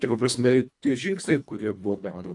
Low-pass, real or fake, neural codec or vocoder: 10.8 kHz; fake; codec, 24 kHz, 1.5 kbps, HILCodec